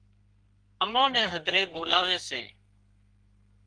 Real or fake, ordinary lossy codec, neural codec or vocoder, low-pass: fake; Opus, 24 kbps; codec, 32 kHz, 1.9 kbps, SNAC; 9.9 kHz